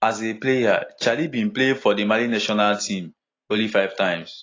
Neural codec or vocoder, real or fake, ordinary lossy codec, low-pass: none; real; AAC, 32 kbps; 7.2 kHz